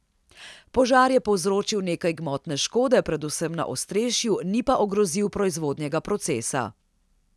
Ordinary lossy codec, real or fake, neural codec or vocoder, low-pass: none; real; none; none